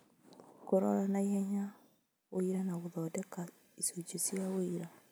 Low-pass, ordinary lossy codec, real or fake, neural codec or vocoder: none; none; real; none